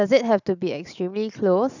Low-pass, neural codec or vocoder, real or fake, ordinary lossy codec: 7.2 kHz; none; real; none